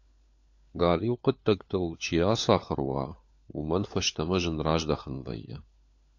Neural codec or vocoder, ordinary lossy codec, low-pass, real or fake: codec, 16 kHz, 4 kbps, FunCodec, trained on LibriTTS, 50 frames a second; AAC, 48 kbps; 7.2 kHz; fake